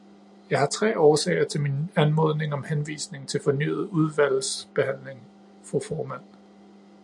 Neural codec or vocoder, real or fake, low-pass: none; real; 10.8 kHz